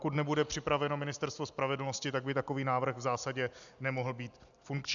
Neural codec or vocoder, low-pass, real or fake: none; 7.2 kHz; real